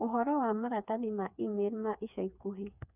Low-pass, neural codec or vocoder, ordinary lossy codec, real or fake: 3.6 kHz; codec, 16 kHz, 4 kbps, FreqCodec, smaller model; none; fake